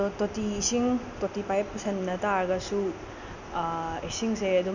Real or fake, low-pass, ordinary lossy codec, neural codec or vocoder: real; 7.2 kHz; none; none